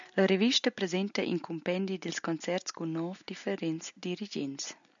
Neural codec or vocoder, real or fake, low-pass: none; real; 7.2 kHz